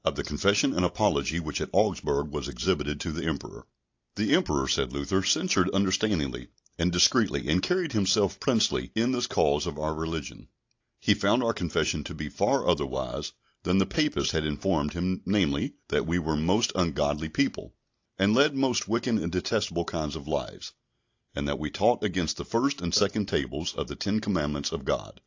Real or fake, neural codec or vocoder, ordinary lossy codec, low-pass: real; none; AAC, 48 kbps; 7.2 kHz